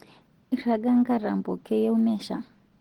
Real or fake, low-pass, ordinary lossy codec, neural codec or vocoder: real; 19.8 kHz; Opus, 16 kbps; none